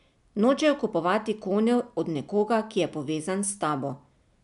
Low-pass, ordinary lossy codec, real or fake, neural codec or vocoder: 10.8 kHz; none; real; none